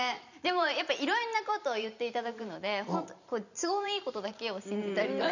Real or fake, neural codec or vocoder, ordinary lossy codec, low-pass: fake; vocoder, 44.1 kHz, 128 mel bands every 512 samples, BigVGAN v2; none; 7.2 kHz